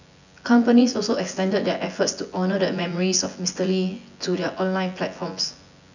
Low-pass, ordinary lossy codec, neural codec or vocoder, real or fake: 7.2 kHz; none; vocoder, 24 kHz, 100 mel bands, Vocos; fake